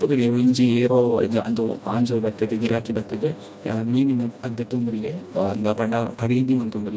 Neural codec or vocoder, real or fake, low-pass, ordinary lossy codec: codec, 16 kHz, 1 kbps, FreqCodec, smaller model; fake; none; none